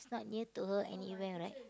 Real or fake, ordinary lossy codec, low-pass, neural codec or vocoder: real; none; none; none